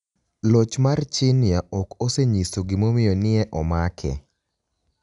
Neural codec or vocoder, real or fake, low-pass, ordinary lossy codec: none; real; 10.8 kHz; none